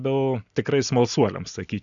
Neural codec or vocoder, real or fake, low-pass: none; real; 7.2 kHz